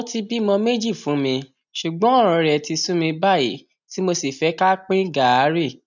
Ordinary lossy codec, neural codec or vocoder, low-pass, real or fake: none; none; 7.2 kHz; real